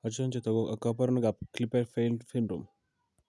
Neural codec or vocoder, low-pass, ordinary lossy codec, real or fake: none; none; none; real